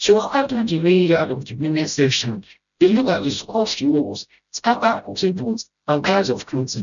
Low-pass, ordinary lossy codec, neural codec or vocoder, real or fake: 7.2 kHz; none; codec, 16 kHz, 0.5 kbps, FreqCodec, smaller model; fake